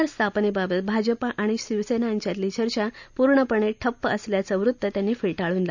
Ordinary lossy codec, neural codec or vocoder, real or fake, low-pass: none; none; real; 7.2 kHz